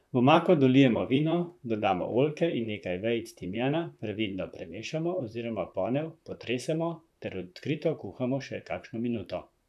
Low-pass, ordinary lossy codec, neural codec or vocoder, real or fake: 14.4 kHz; none; vocoder, 44.1 kHz, 128 mel bands, Pupu-Vocoder; fake